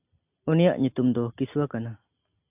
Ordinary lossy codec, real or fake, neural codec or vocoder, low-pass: AAC, 32 kbps; real; none; 3.6 kHz